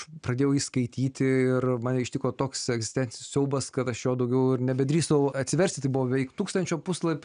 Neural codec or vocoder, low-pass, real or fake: none; 9.9 kHz; real